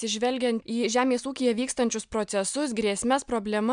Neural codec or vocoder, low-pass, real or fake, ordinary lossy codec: none; 9.9 kHz; real; MP3, 96 kbps